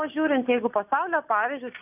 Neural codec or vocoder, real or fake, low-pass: none; real; 3.6 kHz